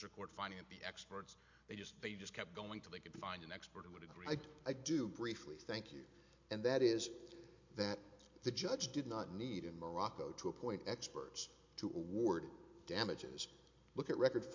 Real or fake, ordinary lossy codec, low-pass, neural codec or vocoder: real; MP3, 48 kbps; 7.2 kHz; none